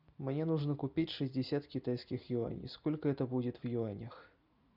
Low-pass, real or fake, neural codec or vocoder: 5.4 kHz; real; none